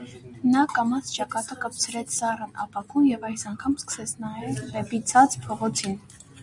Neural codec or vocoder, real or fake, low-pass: none; real; 10.8 kHz